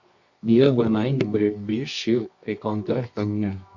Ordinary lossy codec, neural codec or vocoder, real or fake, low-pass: Opus, 64 kbps; codec, 24 kHz, 0.9 kbps, WavTokenizer, medium music audio release; fake; 7.2 kHz